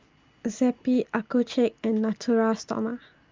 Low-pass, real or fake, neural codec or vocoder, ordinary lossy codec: 7.2 kHz; real; none; Opus, 32 kbps